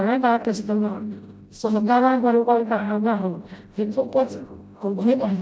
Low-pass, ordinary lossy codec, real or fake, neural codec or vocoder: none; none; fake; codec, 16 kHz, 0.5 kbps, FreqCodec, smaller model